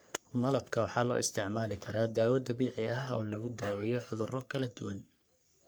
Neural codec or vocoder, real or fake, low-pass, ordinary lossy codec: codec, 44.1 kHz, 3.4 kbps, Pupu-Codec; fake; none; none